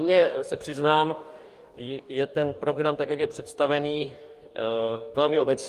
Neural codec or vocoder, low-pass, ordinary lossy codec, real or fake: codec, 44.1 kHz, 2.6 kbps, DAC; 14.4 kHz; Opus, 32 kbps; fake